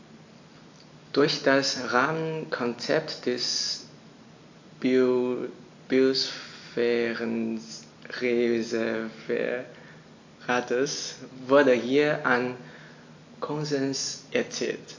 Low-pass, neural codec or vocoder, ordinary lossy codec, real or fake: 7.2 kHz; none; AAC, 48 kbps; real